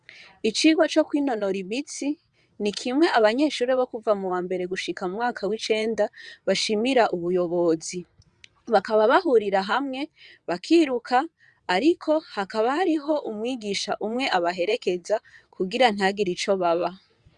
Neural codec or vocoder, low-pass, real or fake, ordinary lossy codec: vocoder, 22.05 kHz, 80 mel bands, WaveNeXt; 9.9 kHz; fake; Opus, 64 kbps